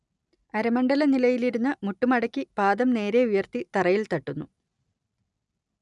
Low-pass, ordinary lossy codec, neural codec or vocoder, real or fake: 10.8 kHz; none; none; real